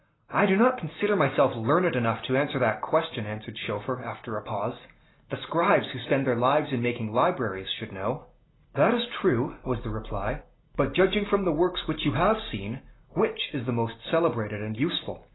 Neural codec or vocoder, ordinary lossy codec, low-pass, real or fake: none; AAC, 16 kbps; 7.2 kHz; real